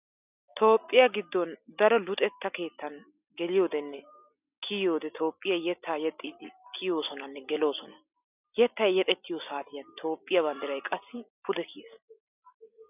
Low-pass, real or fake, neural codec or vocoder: 3.6 kHz; real; none